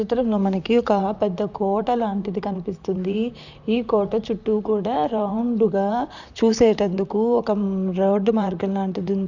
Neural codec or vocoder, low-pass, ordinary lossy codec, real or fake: vocoder, 44.1 kHz, 128 mel bands, Pupu-Vocoder; 7.2 kHz; none; fake